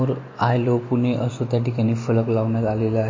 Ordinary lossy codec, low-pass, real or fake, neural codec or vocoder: MP3, 32 kbps; 7.2 kHz; real; none